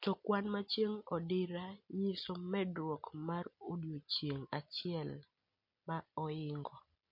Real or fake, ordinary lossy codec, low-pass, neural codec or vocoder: real; MP3, 32 kbps; 5.4 kHz; none